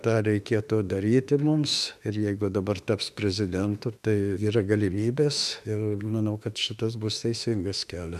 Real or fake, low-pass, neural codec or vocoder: fake; 14.4 kHz; autoencoder, 48 kHz, 32 numbers a frame, DAC-VAE, trained on Japanese speech